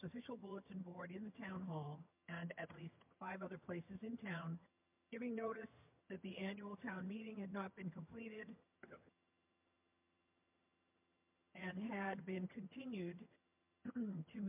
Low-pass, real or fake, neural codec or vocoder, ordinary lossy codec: 3.6 kHz; fake; vocoder, 22.05 kHz, 80 mel bands, HiFi-GAN; MP3, 32 kbps